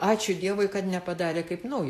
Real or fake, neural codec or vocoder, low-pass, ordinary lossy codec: real; none; 14.4 kHz; AAC, 64 kbps